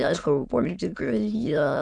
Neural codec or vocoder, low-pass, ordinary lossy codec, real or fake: autoencoder, 22.05 kHz, a latent of 192 numbers a frame, VITS, trained on many speakers; 9.9 kHz; MP3, 96 kbps; fake